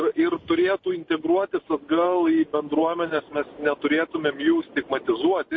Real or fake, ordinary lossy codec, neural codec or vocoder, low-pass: real; MP3, 32 kbps; none; 7.2 kHz